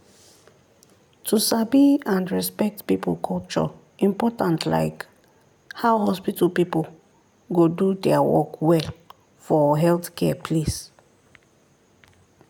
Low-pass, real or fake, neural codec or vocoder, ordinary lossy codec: 19.8 kHz; real; none; none